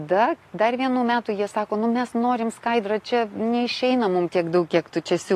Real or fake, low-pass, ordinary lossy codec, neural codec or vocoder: real; 14.4 kHz; AAC, 48 kbps; none